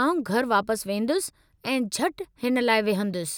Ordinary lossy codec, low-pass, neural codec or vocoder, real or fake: none; none; none; real